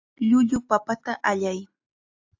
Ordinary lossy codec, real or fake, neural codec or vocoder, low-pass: AAC, 48 kbps; real; none; 7.2 kHz